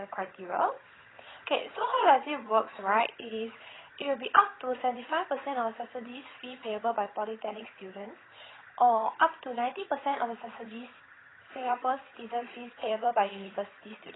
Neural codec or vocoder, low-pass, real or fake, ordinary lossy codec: vocoder, 22.05 kHz, 80 mel bands, HiFi-GAN; 7.2 kHz; fake; AAC, 16 kbps